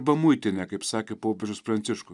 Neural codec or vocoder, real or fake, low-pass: none; real; 10.8 kHz